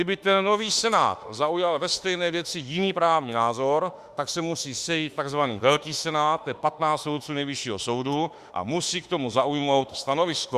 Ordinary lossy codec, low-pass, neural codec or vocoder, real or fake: Opus, 64 kbps; 14.4 kHz; autoencoder, 48 kHz, 32 numbers a frame, DAC-VAE, trained on Japanese speech; fake